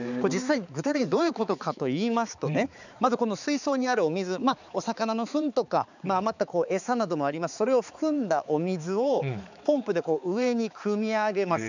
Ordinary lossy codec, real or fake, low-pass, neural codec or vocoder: none; fake; 7.2 kHz; codec, 16 kHz, 4 kbps, X-Codec, HuBERT features, trained on balanced general audio